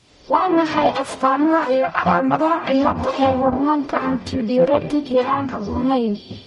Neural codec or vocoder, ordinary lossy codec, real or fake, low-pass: codec, 44.1 kHz, 0.9 kbps, DAC; MP3, 48 kbps; fake; 19.8 kHz